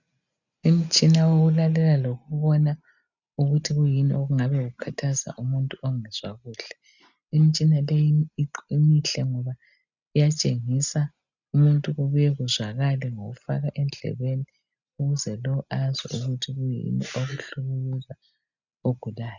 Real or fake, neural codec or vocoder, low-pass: real; none; 7.2 kHz